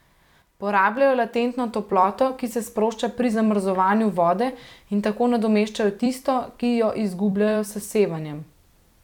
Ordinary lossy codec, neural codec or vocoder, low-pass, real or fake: none; vocoder, 44.1 kHz, 128 mel bands every 256 samples, BigVGAN v2; 19.8 kHz; fake